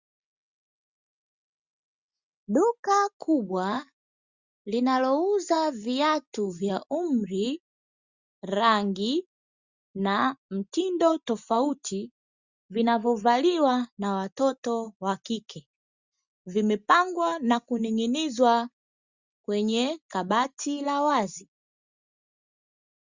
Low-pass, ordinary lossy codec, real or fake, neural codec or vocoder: 7.2 kHz; Opus, 64 kbps; real; none